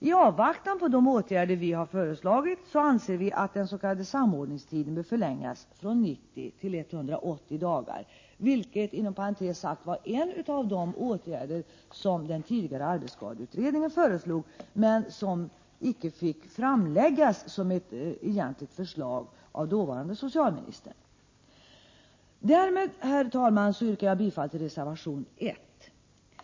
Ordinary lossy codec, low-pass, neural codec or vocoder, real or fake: MP3, 32 kbps; 7.2 kHz; none; real